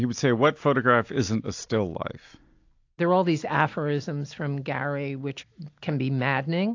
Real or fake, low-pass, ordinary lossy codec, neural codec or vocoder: real; 7.2 kHz; AAC, 48 kbps; none